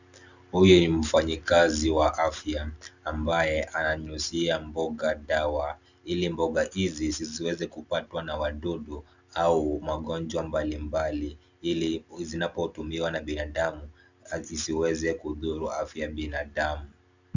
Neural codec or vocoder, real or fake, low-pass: none; real; 7.2 kHz